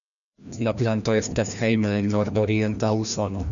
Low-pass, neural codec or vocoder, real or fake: 7.2 kHz; codec, 16 kHz, 1 kbps, FreqCodec, larger model; fake